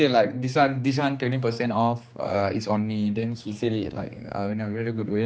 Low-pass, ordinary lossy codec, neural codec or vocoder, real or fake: none; none; codec, 16 kHz, 2 kbps, X-Codec, HuBERT features, trained on general audio; fake